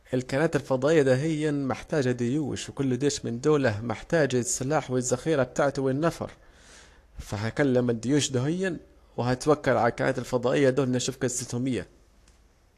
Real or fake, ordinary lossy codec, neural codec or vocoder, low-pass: fake; AAC, 64 kbps; codec, 44.1 kHz, 7.8 kbps, Pupu-Codec; 14.4 kHz